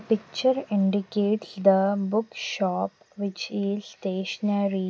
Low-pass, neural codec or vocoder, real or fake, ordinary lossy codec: none; none; real; none